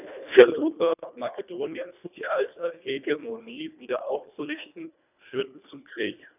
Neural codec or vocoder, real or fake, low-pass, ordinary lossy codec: codec, 24 kHz, 1.5 kbps, HILCodec; fake; 3.6 kHz; none